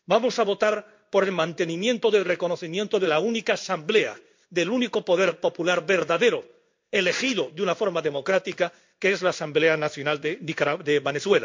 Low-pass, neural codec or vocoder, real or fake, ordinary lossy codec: 7.2 kHz; codec, 16 kHz in and 24 kHz out, 1 kbps, XY-Tokenizer; fake; MP3, 48 kbps